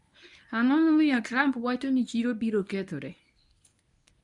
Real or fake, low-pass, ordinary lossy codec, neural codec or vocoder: fake; 10.8 kHz; none; codec, 24 kHz, 0.9 kbps, WavTokenizer, medium speech release version 1